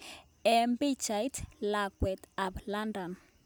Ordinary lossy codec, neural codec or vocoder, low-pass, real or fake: none; none; none; real